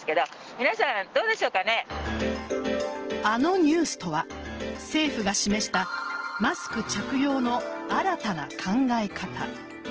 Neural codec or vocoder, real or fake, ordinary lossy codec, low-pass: none; real; Opus, 16 kbps; 7.2 kHz